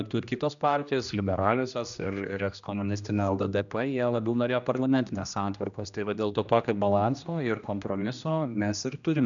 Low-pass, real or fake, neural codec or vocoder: 7.2 kHz; fake; codec, 16 kHz, 1 kbps, X-Codec, HuBERT features, trained on general audio